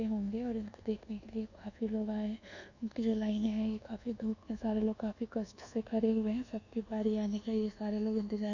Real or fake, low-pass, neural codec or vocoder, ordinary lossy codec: fake; 7.2 kHz; codec, 24 kHz, 1.2 kbps, DualCodec; none